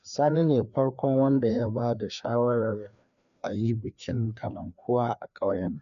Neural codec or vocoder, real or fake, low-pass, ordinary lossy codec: codec, 16 kHz, 2 kbps, FreqCodec, larger model; fake; 7.2 kHz; none